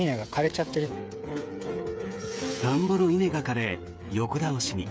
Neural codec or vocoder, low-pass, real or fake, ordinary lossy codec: codec, 16 kHz, 8 kbps, FreqCodec, smaller model; none; fake; none